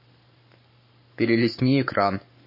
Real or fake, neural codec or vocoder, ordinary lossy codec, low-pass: fake; codec, 44.1 kHz, 7.8 kbps, Pupu-Codec; MP3, 24 kbps; 5.4 kHz